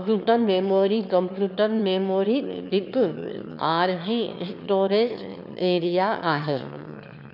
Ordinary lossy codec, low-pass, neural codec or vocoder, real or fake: none; 5.4 kHz; autoencoder, 22.05 kHz, a latent of 192 numbers a frame, VITS, trained on one speaker; fake